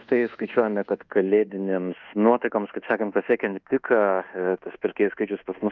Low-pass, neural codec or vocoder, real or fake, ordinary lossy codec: 7.2 kHz; codec, 24 kHz, 1.2 kbps, DualCodec; fake; Opus, 24 kbps